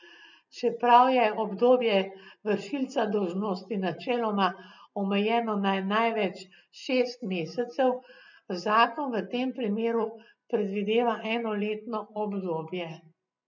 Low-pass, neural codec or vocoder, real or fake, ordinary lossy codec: 7.2 kHz; none; real; none